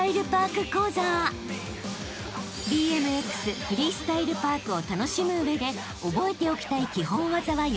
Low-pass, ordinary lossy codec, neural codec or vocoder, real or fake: none; none; none; real